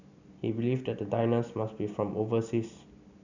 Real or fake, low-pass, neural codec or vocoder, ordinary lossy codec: real; 7.2 kHz; none; none